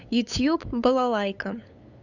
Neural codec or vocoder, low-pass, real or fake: codec, 16 kHz, 8 kbps, FunCodec, trained on LibriTTS, 25 frames a second; 7.2 kHz; fake